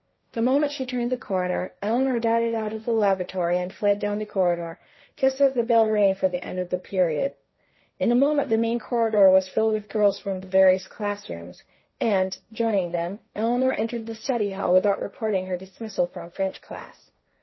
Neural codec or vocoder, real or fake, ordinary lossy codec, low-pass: codec, 16 kHz, 1.1 kbps, Voila-Tokenizer; fake; MP3, 24 kbps; 7.2 kHz